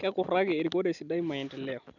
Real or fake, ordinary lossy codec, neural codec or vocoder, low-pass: real; none; none; 7.2 kHz